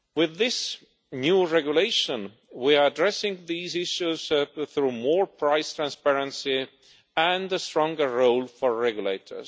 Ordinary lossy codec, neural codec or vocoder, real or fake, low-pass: none; none; real; none